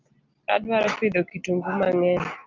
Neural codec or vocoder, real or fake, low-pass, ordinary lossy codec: none; real; 7.2 kHz; Opus, 24 kbps